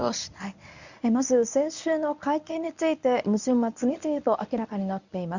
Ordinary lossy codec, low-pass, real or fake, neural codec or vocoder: none; 7.2 kHz; fake; codec, 24 kHz, 0.9 kbps, WavTokenizer, medium speech release version 1